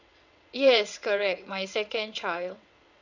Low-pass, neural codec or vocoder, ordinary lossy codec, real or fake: 7.2 kHz; vocoder, 22.05 kHz, 80 mel bands, WaveNeXt; none; fake